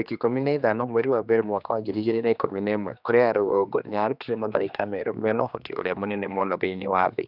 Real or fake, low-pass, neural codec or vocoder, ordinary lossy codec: fake; 5.4 kHz; codec, 16 kHz, 2 kbps, X-Codec, HuBERT features, trained on general audio; none